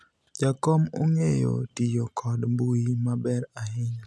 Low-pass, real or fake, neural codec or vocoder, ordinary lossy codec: none; real; none; none